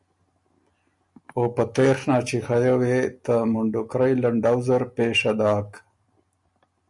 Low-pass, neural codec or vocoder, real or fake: 10.8 kHz; none; real